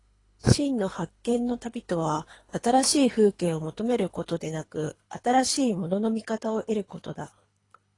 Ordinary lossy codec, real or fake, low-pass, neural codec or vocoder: AAC, 32 kbps; fake; 10.8 kHz; codec, 24 kHz, 3 kbps, HILCodec